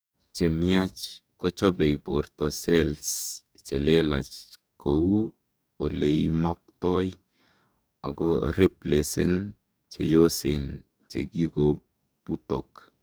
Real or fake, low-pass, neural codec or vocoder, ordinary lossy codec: fake; none; codec, 44.1 kHz, 2.6 kbps, DAC; none